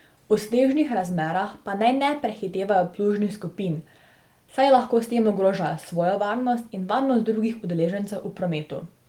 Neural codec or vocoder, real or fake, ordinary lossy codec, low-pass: none; real; Opus, 24 kbps; 19.8 kHz